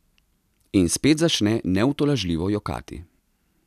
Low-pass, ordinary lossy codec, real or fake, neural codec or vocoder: 14.4 kHz; none; real; none